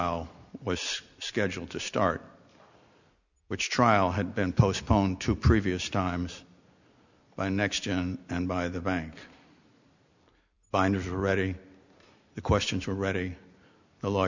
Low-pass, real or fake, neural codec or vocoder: 7.2 kHz; real; none